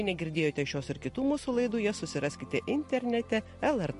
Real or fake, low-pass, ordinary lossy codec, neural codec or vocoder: real; 14.4 kHz; MP3, 48 kbps; none